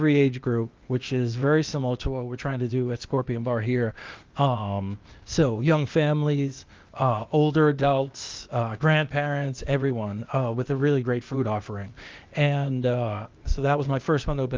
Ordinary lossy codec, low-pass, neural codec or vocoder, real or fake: Opus, 24 kbps; 7.2 kHz; codec, 16 kHz, 0.8 kbps, ZipCodec; fake